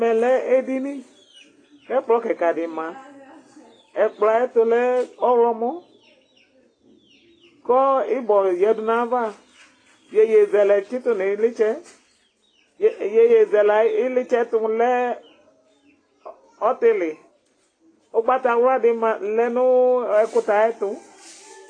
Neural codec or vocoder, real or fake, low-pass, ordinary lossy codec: none; real; 9.9 kHz; AAC, 32 kbps